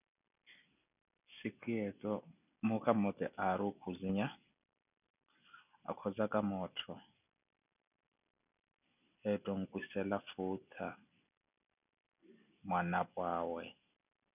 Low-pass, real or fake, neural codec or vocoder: 3.6 kHz; real; none